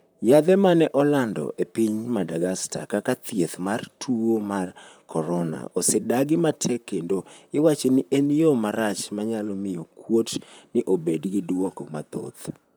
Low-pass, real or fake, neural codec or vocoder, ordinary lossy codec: none; fake; vocoder, 44.1 kHz, 128 mel bands, Pupu-Vocoder; none